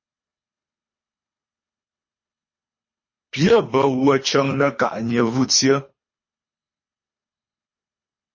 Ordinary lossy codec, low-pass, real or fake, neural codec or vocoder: MP3, 32 kbps; 7.2 kHz; fake; codec, 24 kHz, 3 kbps, HILCodec